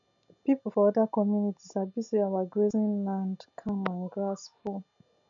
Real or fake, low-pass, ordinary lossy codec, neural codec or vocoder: real; 7.2 kHz; none; none